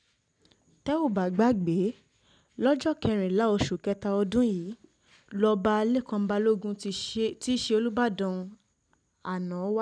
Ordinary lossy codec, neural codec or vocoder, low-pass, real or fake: none; none; 9.9 kHz; real